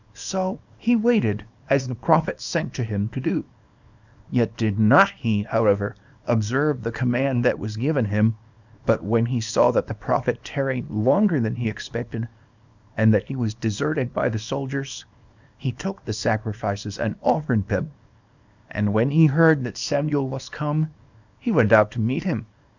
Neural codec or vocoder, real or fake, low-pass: codec, 24 kHz, 0.9 kbps, WavTokenizer, small release; fake; 7.2 kHz